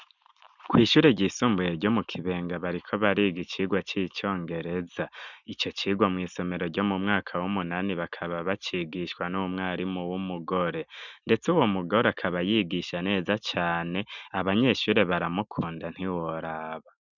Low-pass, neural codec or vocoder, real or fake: 7.2 kHz; none; real